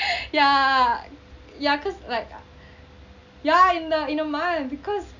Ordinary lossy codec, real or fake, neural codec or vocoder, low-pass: none; real; none; 7.2 kHz